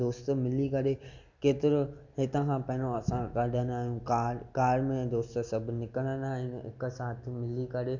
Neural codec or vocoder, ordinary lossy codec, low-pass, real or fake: none; none; 7.2 kHz; real